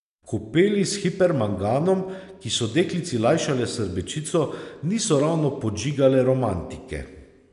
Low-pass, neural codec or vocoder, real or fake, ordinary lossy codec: 10.8 kHz; none; real; none